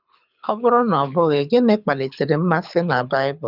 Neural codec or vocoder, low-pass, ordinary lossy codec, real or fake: codec, 24 kHz, 6 kbps, HILCodec; 5.4 kHz; none; fake